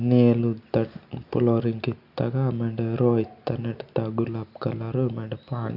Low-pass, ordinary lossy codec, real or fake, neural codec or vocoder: 5.4 kHz; none; real; none